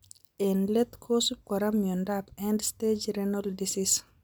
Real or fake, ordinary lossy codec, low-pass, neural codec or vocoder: real; none; none; none